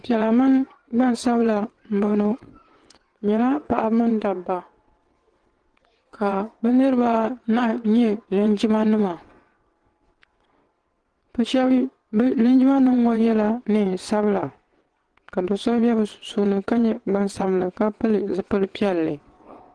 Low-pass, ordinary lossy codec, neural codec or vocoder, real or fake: 9.9 kHz; Opus, 16 kbps; vocoder, 22.05 kHz, 80 mel bands, WaveNeXt; fake